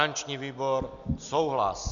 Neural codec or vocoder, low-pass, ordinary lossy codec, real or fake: none; 7.2 kHz; MP3, 96 kbps; real